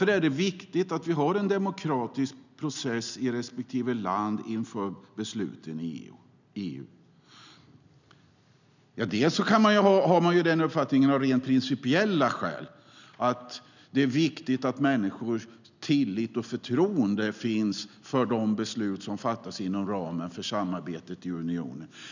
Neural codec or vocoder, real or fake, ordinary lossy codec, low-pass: none; real; none; 7.2 kHz